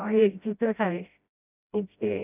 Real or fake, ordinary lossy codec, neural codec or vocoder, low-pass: fake; none; codec, 16 kHz, 0.5 kbps, FreqCodec, smaller model; 3.6 kHz